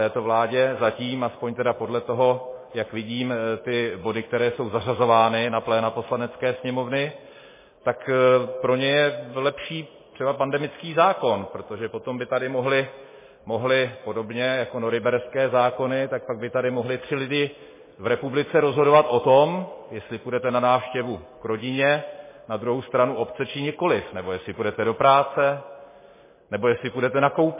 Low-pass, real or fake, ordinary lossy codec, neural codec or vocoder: 3.6 kHz; real; MP3, 16 kbps; none